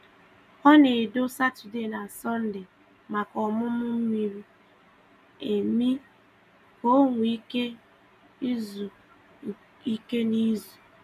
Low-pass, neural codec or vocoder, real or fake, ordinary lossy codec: 14.4 kHz; none; real; none